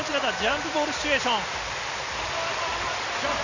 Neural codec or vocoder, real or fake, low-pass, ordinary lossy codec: none; real; 7.2 kHz; Opus, 64 kbps